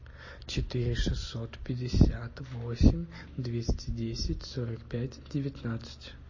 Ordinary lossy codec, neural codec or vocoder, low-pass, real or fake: MP3, 32 kbps; none; 7.2 kHz; real